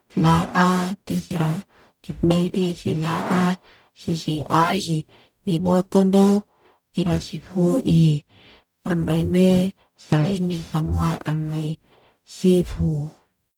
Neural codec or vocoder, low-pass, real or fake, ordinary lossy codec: codec, 44.1 kHz, 0.9 kbps, DAC; 19.8 kHz; fake; none